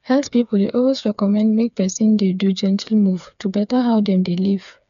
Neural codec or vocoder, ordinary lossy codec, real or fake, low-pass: codec, 16 kHz, 4 kbps, FreqCodec, smaller model; none; fake; 7.2 kHz